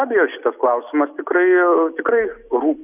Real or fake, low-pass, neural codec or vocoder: real; 3.6 kHz; none